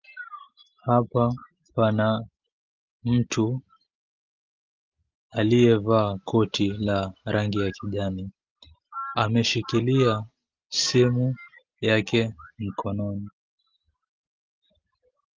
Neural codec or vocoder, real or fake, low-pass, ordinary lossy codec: none; real; 7.2 kHz; Opus, 24 kbps